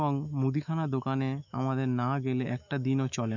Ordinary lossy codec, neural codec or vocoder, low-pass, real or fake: none; autoencoder, 48 kHz, 128 numbers a frame, DAC-VAE, trained on Japanese speech; 7.2 kHz; fake